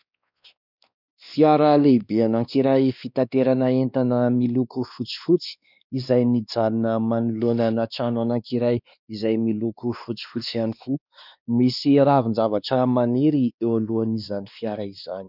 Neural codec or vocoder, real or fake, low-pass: codec, 16 kHz, 2 kbps, X-Codec, WavLM features, trained on Multilingual LibriSpeech; fake; 5.4 kHz